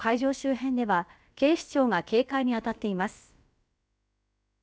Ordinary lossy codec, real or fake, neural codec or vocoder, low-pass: none; fake; codec, 16 kHz, about 1 kbps, DyCAST, with the encoder's durations; none